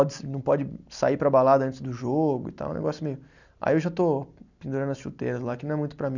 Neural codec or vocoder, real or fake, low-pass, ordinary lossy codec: none; real; 7.2 kHz; none